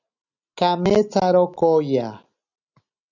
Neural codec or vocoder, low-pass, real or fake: none; 7.2 kHz; real